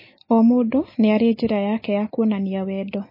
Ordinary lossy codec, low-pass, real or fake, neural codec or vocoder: MP3, 24 kbps; 5.4 kHz; real; none